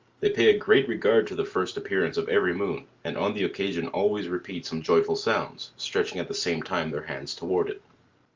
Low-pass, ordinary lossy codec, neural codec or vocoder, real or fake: 7.2 kHz; Opus, 24 kbps; none; real